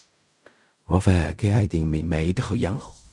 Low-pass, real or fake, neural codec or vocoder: 10.8 kHz; fake; codec, 16 kHz in and 24 kHz out, 0.4 kbps, LongCat-Audio-Codec, fine tuned four codebook decoder